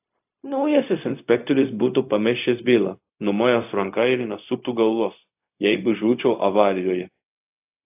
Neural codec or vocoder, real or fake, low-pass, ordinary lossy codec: codec, 16 kHz, 0.4 kbps, LongCat-Audio-Codec; fake; 3.6 kHz; AAC, 32 kbps